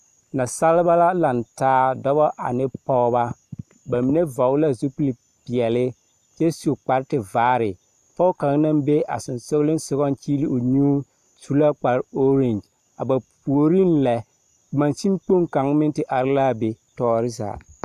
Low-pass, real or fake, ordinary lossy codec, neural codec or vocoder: 14.4 kHz; real; AAC, 96 kbps; none